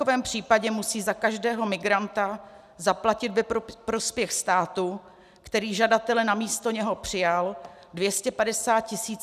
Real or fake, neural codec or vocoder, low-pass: real; none; 14.4 kHz